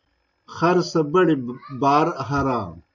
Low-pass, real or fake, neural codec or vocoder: 7.2 kHz; real; none